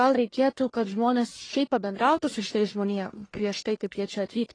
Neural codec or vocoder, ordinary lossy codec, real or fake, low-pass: codec, 44.1 kHz, 1.7 kbps, Pupu-Codec; AAC, 32 kbps; fake; 9.9 kHz